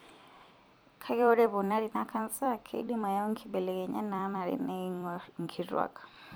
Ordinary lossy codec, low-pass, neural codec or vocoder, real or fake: none; none; vocoder, 44.1 kHz, 128 mel bands every 256 samples, BigVGAN v2; fake